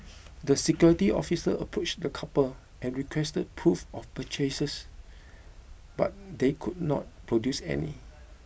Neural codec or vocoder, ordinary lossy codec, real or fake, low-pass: none; none; real; none